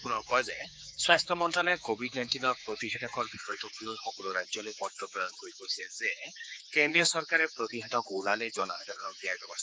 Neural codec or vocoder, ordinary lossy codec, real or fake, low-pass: codec, 16 kHz, 4 kbps, X-Codec, HuBERT features, trained on general audio; none; fake; none